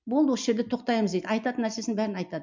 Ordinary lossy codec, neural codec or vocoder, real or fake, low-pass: MP3, 64 kbps; none; real; 7.2 kHz